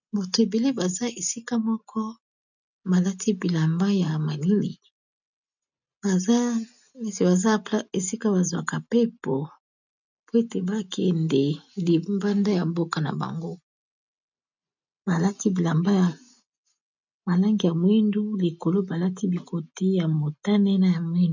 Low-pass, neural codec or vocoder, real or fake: 7.2 kHz; none; real